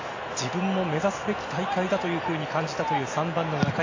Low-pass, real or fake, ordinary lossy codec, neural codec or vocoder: 7.2 kHz; real; AAC, 32 kbps; none